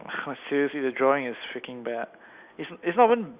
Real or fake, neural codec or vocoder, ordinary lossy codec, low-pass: real; none; Opus, 64 kbps; 3.6 kHz